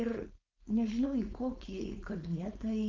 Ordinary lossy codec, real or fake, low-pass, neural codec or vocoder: Opus, 32 kbps; fake; 7.2 kHz; codec, 16 kHz, 4.8 kbps, FACodec